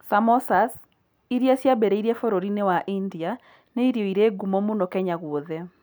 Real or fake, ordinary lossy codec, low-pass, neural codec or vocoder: real; none; none; none